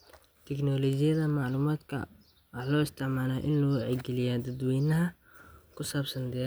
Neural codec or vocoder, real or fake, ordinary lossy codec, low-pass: none; real; none; none